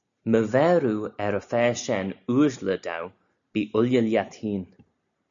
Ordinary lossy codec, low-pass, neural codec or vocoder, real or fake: MP3, 96 kbps; 7.2 kHz; none; real